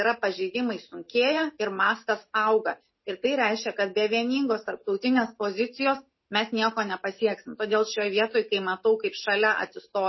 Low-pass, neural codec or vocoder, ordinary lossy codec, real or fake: 7.2 kHz; none; MP3, 24 kbps; real